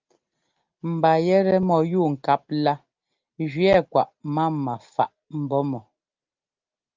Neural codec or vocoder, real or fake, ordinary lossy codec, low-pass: none; real; Opus, 24 kbps; 7.2 kHz